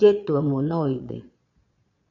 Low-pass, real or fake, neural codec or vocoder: 7.2 kHz; fake; vocoder, 22.05 kHz, 80 mel bands, Vocos